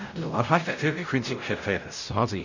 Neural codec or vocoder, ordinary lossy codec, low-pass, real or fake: codec, 16 kHz, 0.5 kbps, X-Codec, WavLM features, trained on Multilingual LibriSpeech; none; 7.2 kHz; fake